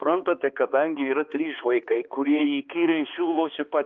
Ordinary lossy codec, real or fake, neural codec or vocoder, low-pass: Opus, 24 kbps; fake; codec, 16 kHz, 2 kbps, X-Codec, HuBERT features, trained on balanced general audio; 7.2 kHz